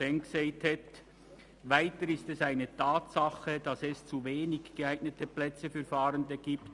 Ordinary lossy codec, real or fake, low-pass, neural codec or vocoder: Opus, 64 kbps; real; 10.8 kHz; none